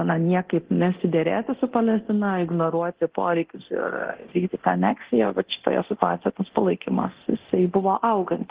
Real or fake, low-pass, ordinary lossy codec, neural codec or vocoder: fake; 3.6 kHz; Opus, 16 kbps; codec, 24 kHz, 0.9 kbps, DualCodec